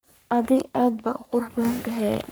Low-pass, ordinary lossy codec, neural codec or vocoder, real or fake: none; none; codec, 44.1 kHz, 3.4 kbps, Pupu-Codec; fake